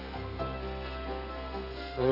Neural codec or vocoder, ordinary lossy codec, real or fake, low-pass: codec, 32 kHz, 1.9 kbps, SNAC; AAC, 48 kbps; fake; 5.4 kHz